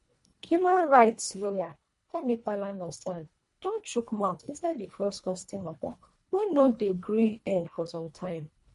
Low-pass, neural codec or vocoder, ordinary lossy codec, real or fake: 10.8 kHz; codec, 24 kHz, 1.5 kbps, HILCodec; MP3, 48 kbps; fake